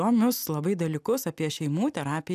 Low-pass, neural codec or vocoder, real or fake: 14.4 kHz; none; real